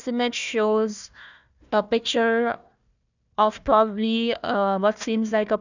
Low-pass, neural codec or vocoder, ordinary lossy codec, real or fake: 7.2 kHz; codec, 16 kHz, 1 kbps, FunCodec, trained on Chinese and English, 50 frames a second; none; fake